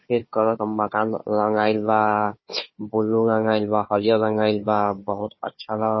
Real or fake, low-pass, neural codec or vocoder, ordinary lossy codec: fake; 7.2 kHz; codec, 16 kHz, 4 kbps, FunCodec, trained on Chinese and English, 50 frames a second; MP3, 24 kbps